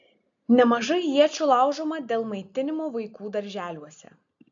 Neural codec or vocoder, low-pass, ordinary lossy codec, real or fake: none; 7.2 kHz; AAC, 64 kbps; real